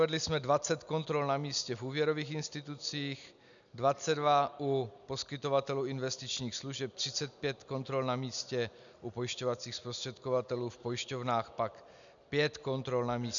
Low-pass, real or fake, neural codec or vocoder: 7.2 kHz; real; none